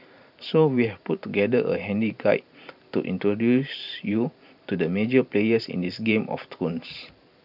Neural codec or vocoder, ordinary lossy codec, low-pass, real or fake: vocoder, 44.1 kHz, 128 mel bands every 512 samples, BigVGAN v2; none; 5.4 kHz; fake